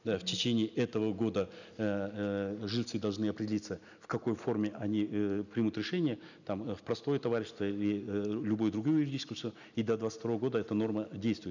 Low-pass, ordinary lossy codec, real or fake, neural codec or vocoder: 7.2 kHz; none; real; none